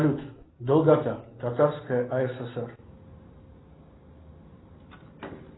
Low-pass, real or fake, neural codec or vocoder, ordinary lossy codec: 7.2 kHz; real; none; AAC, 16 kbps